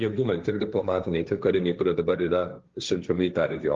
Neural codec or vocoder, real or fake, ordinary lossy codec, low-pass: codec, 16 kHz, 1.1 kbps, Voila-Tokenizer; fake; Opus, 32 kbps; 7.2 kHz